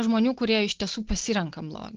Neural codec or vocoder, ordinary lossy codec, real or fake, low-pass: none; Opus, 16 kbps; real; 7.2 kHz